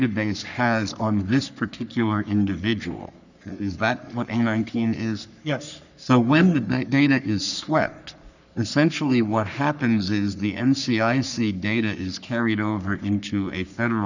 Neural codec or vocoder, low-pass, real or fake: codec, 44.1 kHz, 3.4 kbps, Pupu-Codec; 7.2 kHz; fake